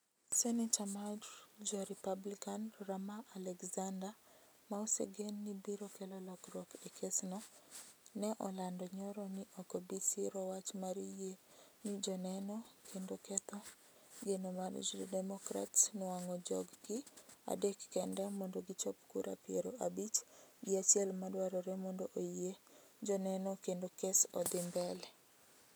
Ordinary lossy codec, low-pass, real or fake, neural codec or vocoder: none; none; real; none